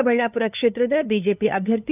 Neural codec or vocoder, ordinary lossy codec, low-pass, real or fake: codec, 16 kHz, 2 kbps, FunCodec, trained on LibriTTS, 25 frames a second; none; 3.6 kHz; fake